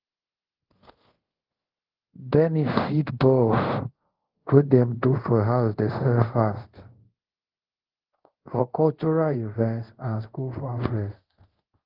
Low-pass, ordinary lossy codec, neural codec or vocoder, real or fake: 5.4 kHz; Opus, 16 kbps; codec, 24 kHz, 0.5 kbps, DualCodec; fake